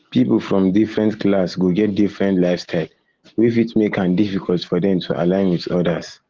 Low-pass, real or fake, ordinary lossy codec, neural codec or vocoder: 7.2 kHz; real; Opus, 16 kbps; none